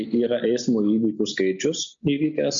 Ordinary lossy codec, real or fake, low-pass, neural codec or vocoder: MP3, 48 kbps; real; 7.2 kHz; none